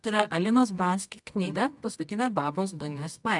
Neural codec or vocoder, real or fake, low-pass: codec, 24 kHz, 0.9 kbps, WavTokenizer, medium music audio release; fake; 10.8 kHz